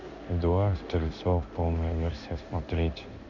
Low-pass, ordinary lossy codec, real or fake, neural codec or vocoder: 7.2 kHz; none; fake; codec, 16 kHz in and 24 kHz out, 1 kbps, XY-Tokenizer